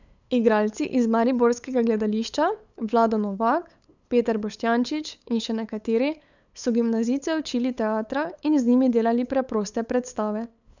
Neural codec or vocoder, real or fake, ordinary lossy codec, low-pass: codec, 16 kHz, 8 kbps, FunCodec, trained on LibriTTS, 25 frames a second; fake; none; 7.2 kHz